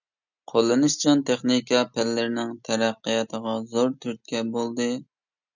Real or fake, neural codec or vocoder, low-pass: real; none; 7.2 kHz